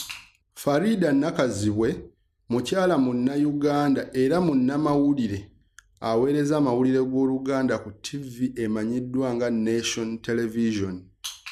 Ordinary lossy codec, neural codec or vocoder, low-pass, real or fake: none; none; 14.4 kHz; real